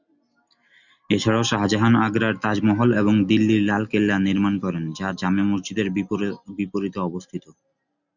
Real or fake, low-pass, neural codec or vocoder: real; 7.2 kHz; none